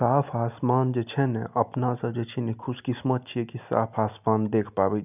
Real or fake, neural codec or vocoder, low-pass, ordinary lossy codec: real; none; 3.6 kHz; none